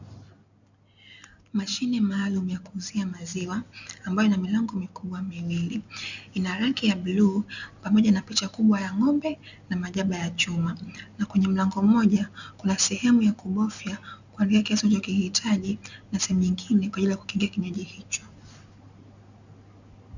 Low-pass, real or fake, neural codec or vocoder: 7.2 kHz; real; none